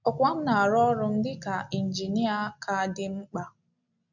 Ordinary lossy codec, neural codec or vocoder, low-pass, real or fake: none; none; 7.2 kHz; real